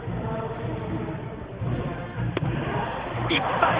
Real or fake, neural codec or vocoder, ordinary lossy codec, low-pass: fake; codec, 16 kHz, 4 kbps, X-Codec, HuBERT features, trained on balanced general audio; Opus, 32 kbps; 3.6 kHz